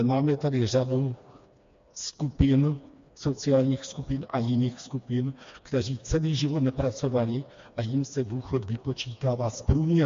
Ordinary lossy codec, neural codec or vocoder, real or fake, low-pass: MP3, 48 kbps; codec, 16 kHz, 2 kbps, FreqCodec, smaller model; fake; 7.2 kHz